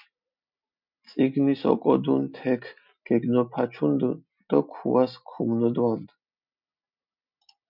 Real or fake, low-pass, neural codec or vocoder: real; 5.4 kHz; none